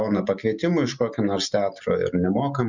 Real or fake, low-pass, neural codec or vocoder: real; 7.2 kHz; none